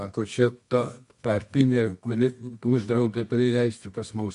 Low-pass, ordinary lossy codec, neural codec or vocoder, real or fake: 10.8 kHz; MP3, 64 kbps; codec, 24 kHz, 0.9 kbps, WavTokenizer, medium music audio release; fake